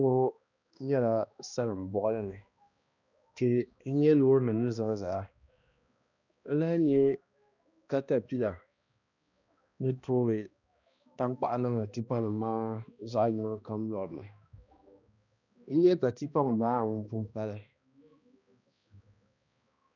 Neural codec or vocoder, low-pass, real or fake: codec, 16 kHz, 1 kbps, X-Codec, HuBERT features, trained on balanced general audio; 7.2 kHz; fake